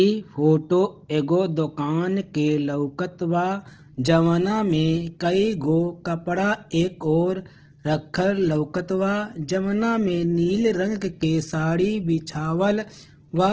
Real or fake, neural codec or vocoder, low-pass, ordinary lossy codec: real; none; 7.2 kHz; Opus, 16 kbps